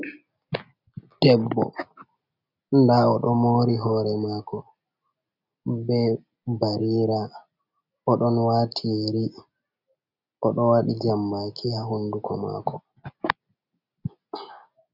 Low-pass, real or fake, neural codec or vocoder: 5.4 kHz; real; none